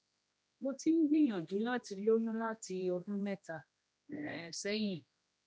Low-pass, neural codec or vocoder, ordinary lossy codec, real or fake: none; codec, 16 kHz, 1 kbps, X-Codec, HuBERT features, trained on general audio; none; fake